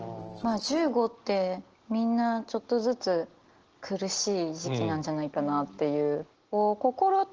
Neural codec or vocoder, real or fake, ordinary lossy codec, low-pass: none; real; Opus, 16 kbps; 7.2 kHz